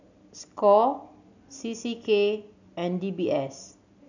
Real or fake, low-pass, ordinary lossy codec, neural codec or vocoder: real; 7.2 kHz; none; none